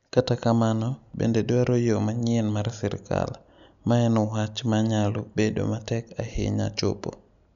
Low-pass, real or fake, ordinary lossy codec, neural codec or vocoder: 7.2 kHz; real; none; none